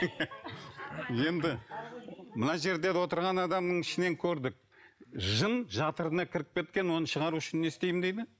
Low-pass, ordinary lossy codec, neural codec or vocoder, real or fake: none; none; none; real